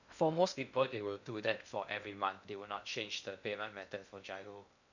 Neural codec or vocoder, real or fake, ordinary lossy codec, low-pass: codec, 16 kHz in and 24 kHz out, 0.6 kbps, FocalCodec, streaming, 2048 codes; fake; none; 7.2 kHz